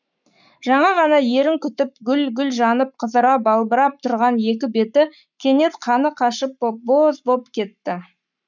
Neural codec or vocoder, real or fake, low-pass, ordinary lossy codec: codec, 44.1 kHz, 7.8 kbps, Pupu-Codec; fake; 7.2 kHz; none